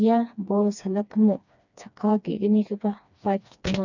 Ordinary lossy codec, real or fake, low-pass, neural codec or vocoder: none; fake; 7.2 kHz; codec, 16 kHz, 2 kbps, FreqCodec, smaller model